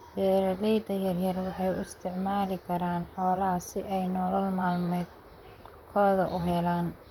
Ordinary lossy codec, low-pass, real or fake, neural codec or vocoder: none; 19.8 kHz; fake; vocoder, 44.1 kHz, 128 mel bands, Pupu-Vocoder